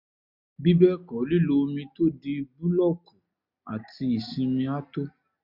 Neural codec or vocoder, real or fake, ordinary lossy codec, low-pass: none; real; MP3, 48 kbps; 5.4 kHz